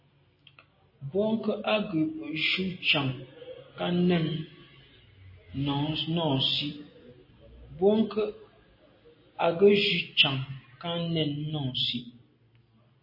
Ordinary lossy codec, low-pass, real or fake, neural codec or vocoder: MP3, 24 kbps; 5.4 kHz; real; none